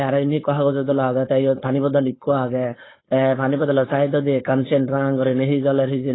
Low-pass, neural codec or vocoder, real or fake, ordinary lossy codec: 7.2 kHz; codec, 16 kHz, 4.8 kbps, FACodec; fake; AAC, 16 kbps